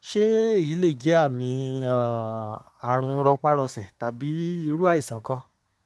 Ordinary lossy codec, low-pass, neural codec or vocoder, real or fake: none; none; codec, 24 kHz, 1 kbps, SNAC; fake